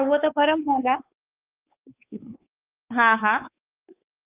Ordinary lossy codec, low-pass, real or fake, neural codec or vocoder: Opus, 24 kbps; 3.6 kHz; fake; codec, 16 kHz, 4 kbps, X-Codec, WavLM features, trained on Multilingual LibriSpeech